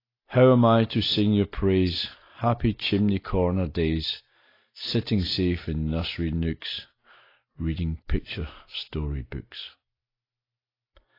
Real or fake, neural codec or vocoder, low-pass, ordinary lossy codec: real; none; 5.4 kHz; AAC, 24 kbps